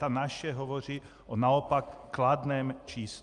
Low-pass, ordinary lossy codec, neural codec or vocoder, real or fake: 10.8 kHz; Opus, 32 kbps; none; real